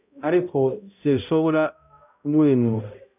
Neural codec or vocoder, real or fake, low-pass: codec, 16 kHz, 0.5 kbps, X-Codec, HuBERT features, trained on balanced general audio; fake; 3.6 kHz